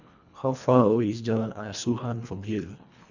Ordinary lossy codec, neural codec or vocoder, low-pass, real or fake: none; codec, 24 kHz, 1.5 kbps, HILCodec; 7.2 kHz; fake